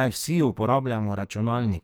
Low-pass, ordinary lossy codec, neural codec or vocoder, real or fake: none; none; codec, 44.1 kHz, 2.6 kbps, SNAC; fake